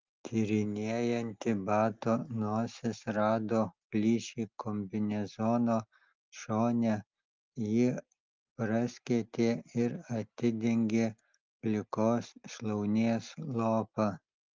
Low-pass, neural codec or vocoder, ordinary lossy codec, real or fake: 7.2 kHz; none; Opus, 32 kbps; real